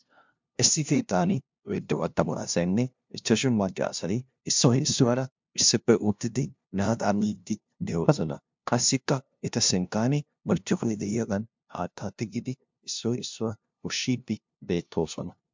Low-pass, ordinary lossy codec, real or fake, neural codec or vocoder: 7.2 kHz; MP3, 64 kbps; fake; codec, 16 kHz, 0.5 kbps, FunCodec, trained on LibriTTS, 25 frames a second